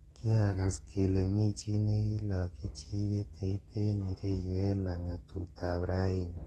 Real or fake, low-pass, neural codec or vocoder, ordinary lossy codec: fake; 14.4 kHz; codec, 32 kHz, 1.9 kbps, SNAC; AAC, 32 kbps